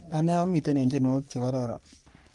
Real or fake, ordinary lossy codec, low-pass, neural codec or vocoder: fake; Opus, 32 kbps; 10.8 kHz; codec, 44.1 kHz, 3.4 kbps, Pupu-Codec